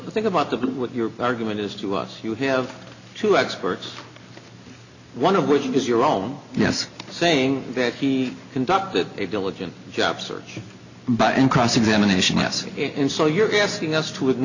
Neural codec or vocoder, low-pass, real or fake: none; 7.2 kHz; real